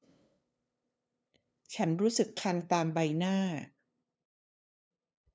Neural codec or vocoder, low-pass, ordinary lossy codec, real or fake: codec, 16 kHz, 2 kbps, FunCodec, trained on LibriTTS, 25 frames a second; none; none; fake